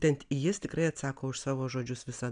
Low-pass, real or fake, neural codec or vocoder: 9.9 kHz; real; none